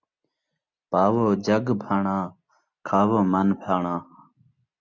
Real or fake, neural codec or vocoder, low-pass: real; none; 7.2 kHz